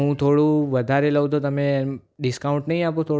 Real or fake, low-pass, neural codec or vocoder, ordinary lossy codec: real; none; none; none